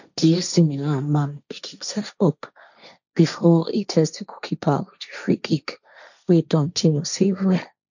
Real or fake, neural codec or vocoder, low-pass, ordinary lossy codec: fake; codec, 16 kHz, 1.1 kbps, Voila-Tokenizer; 7.2 kHz; none